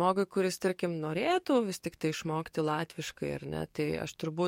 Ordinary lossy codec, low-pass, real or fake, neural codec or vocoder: MP3, 64 kbps; 19.8 kHz; fake; codec, 44.1 kHz, 7.8 kbps, DAC